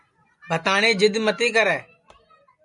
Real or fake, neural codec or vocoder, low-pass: real; none; 10.8 kHz